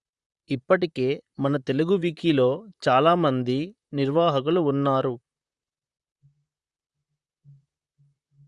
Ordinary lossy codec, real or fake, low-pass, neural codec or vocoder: Opus, 64 kbps; fake; 10.8 kHz; vocoder, 44.1 kHz, 128 mel bands, Pupu-Vocoder